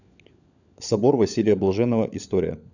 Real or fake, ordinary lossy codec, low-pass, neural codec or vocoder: fake; none; 7.2 kHz; codec, 16 kHz, 16 kbps, FunCodec, trained on LibriTTS, 50 frames a second